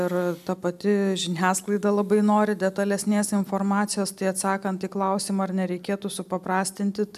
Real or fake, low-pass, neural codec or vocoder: real; 14.4 kHz; none